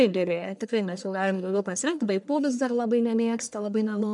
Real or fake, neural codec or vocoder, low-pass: fake; codec, 44.1 kHz, 1.7 kbps, Pupu-Codec; 10.8 kHz